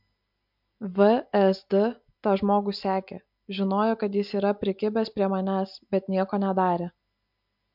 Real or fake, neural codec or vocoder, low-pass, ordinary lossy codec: real; none; 5.4 kHz; MP3, 48 kbps